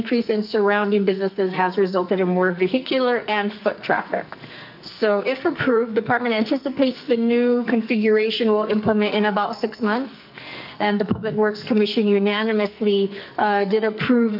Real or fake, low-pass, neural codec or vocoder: fake; 5.4 kHz; codec, 44.1 kHz, 2.6 kbps, SNAC